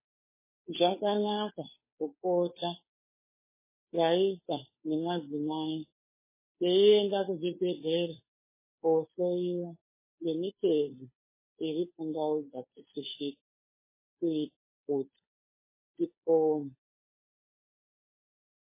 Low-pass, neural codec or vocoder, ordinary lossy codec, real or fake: 3.6 kHz; codec, 16 kHz, 2 kbps, FunCodec, trained on Chinese and English, 25 frames a second; MP3, 16 kbps; fake